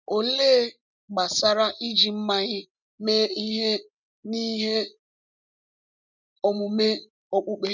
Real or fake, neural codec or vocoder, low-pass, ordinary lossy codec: real; none; 7.2 kHz; none